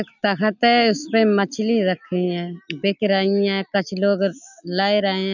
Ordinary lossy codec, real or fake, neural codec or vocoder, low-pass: none; real; none; 7.2 kHz